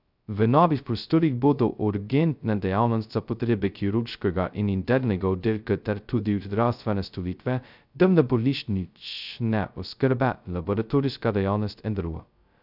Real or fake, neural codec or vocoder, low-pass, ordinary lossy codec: fake; codec, 16 kHz, 0.2 kbps, FocalCodec; 5.4 kHz; none